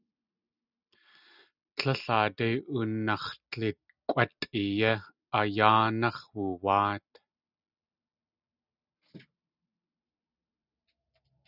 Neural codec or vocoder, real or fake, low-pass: none; real; 5.4 kHz